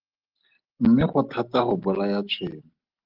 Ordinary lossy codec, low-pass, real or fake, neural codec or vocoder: Opus, 16 kbps; 5.4 kHz; real; none